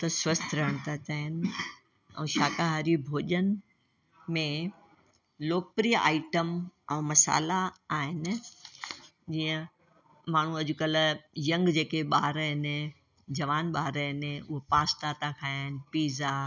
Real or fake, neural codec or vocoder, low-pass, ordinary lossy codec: real; none; 7.2 kHz; none